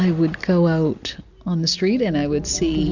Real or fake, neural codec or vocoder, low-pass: real; none; 7.2 kHz